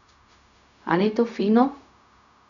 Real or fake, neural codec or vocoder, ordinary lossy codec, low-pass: fake; codec, 16 kHz, 0.4 kbps, LongCat-Audio-Codec; none; 7.2 kHz